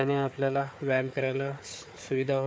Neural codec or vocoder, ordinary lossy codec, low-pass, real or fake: codec, 16 kHz, 4 kbps, FunCodec, trained on Chinese and English, 50 frames a second; none; none; fake